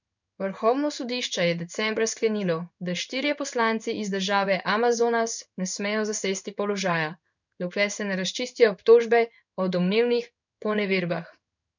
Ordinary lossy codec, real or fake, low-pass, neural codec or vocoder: none; fake; 7.2 kHz; codec, 16 kHz in and 24 kHz out, 1 kbps, XY-Tokenizer